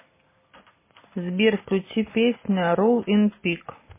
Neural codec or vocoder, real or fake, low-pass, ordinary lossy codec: none; real; 3.6 kHz; MP3, 16 kbps